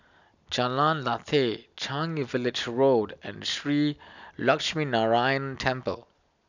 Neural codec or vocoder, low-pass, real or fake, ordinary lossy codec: none; 7.2 kHz; real; none